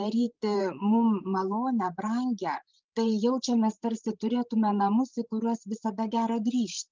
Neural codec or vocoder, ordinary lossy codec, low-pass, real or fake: codec, 16 kHz, 16 kbps, FreqCodec, larger model; Opus, 24 kbps; 7.2 kHz; fake